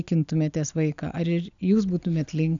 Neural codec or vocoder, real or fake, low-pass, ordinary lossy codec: none; real; 7.2 kHz; MP3, 96 kbps